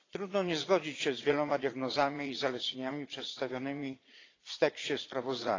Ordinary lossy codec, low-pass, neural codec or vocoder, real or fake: AAC, 32 kbps; 7.2 kHz; vocoder, 22.05 kHz, 80 mel bands, Vocos; fake